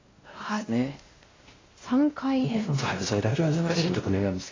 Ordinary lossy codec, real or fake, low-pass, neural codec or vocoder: AAC, 32 kbps; fake; 7.2 kHz; codec, 16 kHz, 1 kbps, X-Codec, WavLM features, trained on Multilingual LibriSpeech